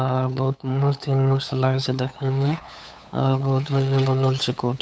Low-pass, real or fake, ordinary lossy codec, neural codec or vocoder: none; fake; none; codec, 16 kHz, 8 kbps, FunCodec, trained on LibriTTS, 25 frames a second